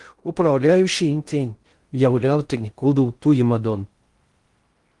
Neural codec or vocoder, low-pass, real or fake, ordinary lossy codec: codec, 16 kHz in and 24 kHz out, 0.6 kbps, FocalCodec, streaming, 2048 codes; 10.8 kHz; fake; Opus, 24 kbps